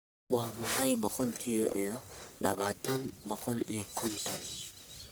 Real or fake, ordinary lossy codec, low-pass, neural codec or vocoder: fake; none; none; codec, 44.1 kHz, 1.7 kbps, Pupu-Codec